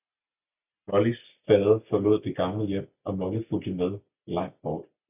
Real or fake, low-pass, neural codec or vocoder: real; 3.6 kHz; none